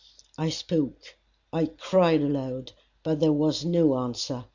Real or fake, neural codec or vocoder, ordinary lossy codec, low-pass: real; none; Opus, 64 kbps; 7.2 kHz